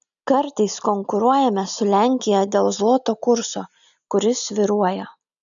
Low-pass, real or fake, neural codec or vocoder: 7.2 kHz; real; none